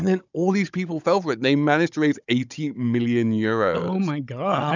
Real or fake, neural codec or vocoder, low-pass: fake; codec, 16 kHz, 16 kbps, FunCodec, trained on Chinese and English, 50 frames a second; 7.2 kHz